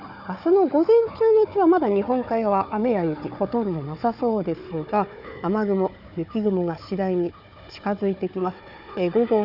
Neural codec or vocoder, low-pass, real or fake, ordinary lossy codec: codec, 16 kHz, 4 kbps, FunCodec, trained on Chinese and English, 50 frames a second; 5.4 kHz; fake; none